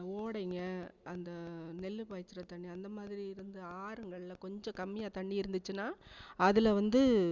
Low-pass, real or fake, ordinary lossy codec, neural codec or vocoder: 7.2 kHz; real; Opus, 64 kbps; none